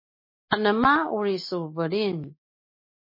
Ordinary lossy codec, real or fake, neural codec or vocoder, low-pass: MP3, 24 kbps; real; none; 5.4 kHz